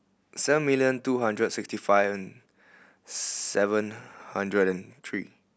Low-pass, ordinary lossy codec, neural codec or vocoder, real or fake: none; none; none; real